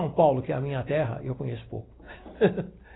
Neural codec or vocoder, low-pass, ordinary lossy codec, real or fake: none; 7.2 kHz; AAC, 16 kbps; real